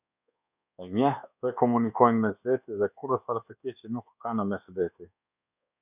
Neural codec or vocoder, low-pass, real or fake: codec, 24 kHz, 1.2 kbps, DualCodec; 3.6 kHz; fake